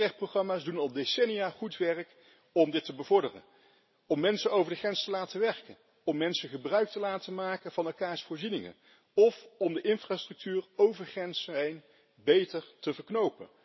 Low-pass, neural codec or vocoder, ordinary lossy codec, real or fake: 7.2 kHz; none; MP3, 24 kbps; real